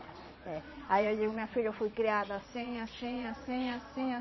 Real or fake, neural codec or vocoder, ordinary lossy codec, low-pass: fake; vocoder, 22.05 kHz, 80 mel bands, Vocos; MP3, 24 kbps; 7.2 kHz